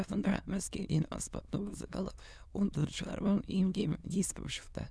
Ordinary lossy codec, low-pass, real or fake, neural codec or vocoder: none; none; fake; autoencoder, 22.05 kHz, a latent of 192 numbers a frame, VITS, trained on many speakers